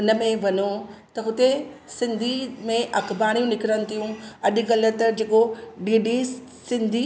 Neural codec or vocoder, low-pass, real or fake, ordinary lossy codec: none; none; real; none